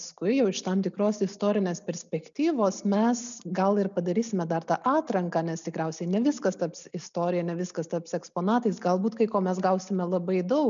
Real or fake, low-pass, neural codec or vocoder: real; 7.2 kHz; none